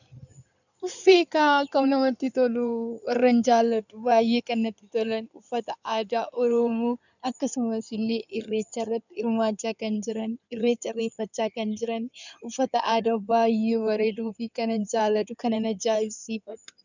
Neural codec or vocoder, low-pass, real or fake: codec, 16 kHz in and 24 kHz out, 2.2 kbps, FireRedTTS-2 codec; 7.2 kHz; fake